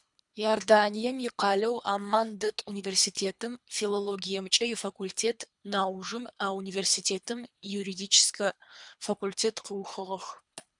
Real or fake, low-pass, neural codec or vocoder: fake; 10.8 kHz; codec, 24 kHz, 3 kbps, HILCodec